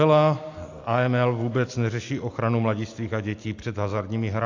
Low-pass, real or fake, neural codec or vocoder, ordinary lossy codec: 7.2 kHz; real; none; AAC, 48 kbps